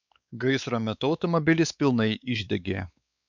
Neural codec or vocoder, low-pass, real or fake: codec, 16 kHz, 4 kbps, X-Codec, WavLM features, trained on Multilingual LibriSpeech; 7.2 kHz; fake